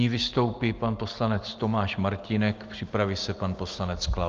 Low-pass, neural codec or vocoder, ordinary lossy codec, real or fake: 7.2 kHz; none; Opus, 24 kbps; real